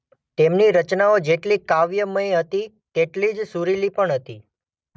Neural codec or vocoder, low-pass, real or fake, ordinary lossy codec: none; none; real; none